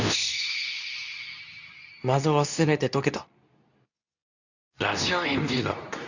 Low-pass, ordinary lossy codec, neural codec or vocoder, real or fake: 7.2 kHz; none; codec, 24 kHz, 0.9 kbps, WavTokenizer, medium speech release version 2; fake